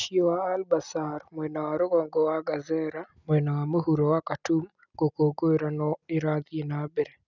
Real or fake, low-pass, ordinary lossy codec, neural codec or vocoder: real; 7.2 kHz; none; none